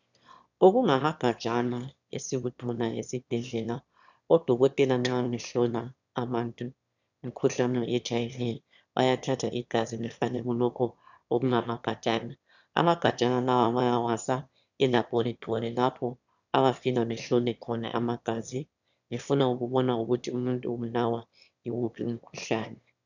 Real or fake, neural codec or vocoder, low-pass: fake; autoencoder, 22.05 kHz, a latent of 192 numbers a frame, VITS, trained on one speaker; 7.2 kHz